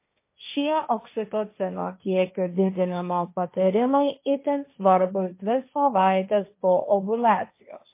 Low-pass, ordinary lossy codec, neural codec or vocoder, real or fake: 3.6 kHz; MP3, 24 kbps; codec, 16 kHz, 1.1 kbps, Voila-Tokenizer; fake